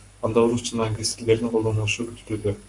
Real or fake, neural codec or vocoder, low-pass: fake; codec, 44.1 kHz, 7.8 kbps, Pupu-Codec; 10.8 kHz